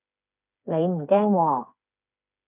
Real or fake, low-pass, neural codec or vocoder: fake; 3.6 kHz; codec, 16 kHz, 4 kbps, FreqCodec, smaller model